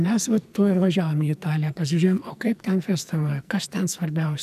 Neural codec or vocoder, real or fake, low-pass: codec, 44.1 kHz, 2.6 kbps, SNAC; fake; 14.4 kHz